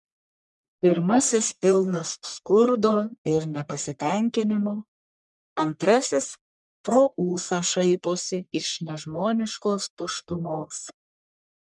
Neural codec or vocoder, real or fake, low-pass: codec, 44.1 kHz, 1.7 kbps, Pupu-Codec; fake; 10.8 kHz